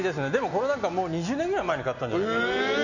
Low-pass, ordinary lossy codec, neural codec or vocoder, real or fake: 7.2 kHz; none; none; real